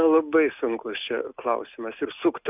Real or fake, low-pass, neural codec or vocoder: real; 3.6 kHz; none